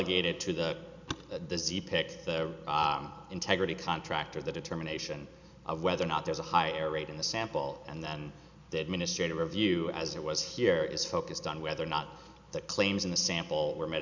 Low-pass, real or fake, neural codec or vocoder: 7.2 kHz; real; none